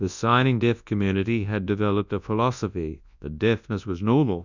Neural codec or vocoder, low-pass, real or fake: codec, 24 kHz, 0.9 kbps, WavTokenizer, large speech release; 7.2 kHz; fake